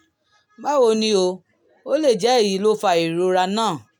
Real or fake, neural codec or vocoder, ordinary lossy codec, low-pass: real; none; none; 19.8 kHz